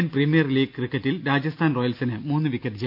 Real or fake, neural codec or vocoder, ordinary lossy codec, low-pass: real; none; none; 5.4 kHz